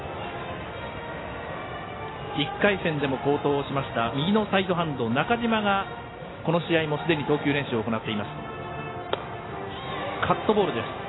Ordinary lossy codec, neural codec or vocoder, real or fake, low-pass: AAC, 16 kbps; none; real; 7.2 kHz